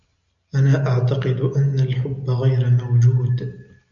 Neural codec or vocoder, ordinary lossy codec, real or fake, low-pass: none; MP3, 96 kbps; real; 7.2 kHz